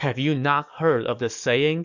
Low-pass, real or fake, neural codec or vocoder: 7.2 kHz; fake; codec, 44.1 kHz, 7.8 kbps, DAC